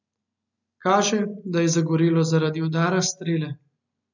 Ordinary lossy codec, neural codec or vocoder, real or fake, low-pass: none; none; real; 7.2 kHz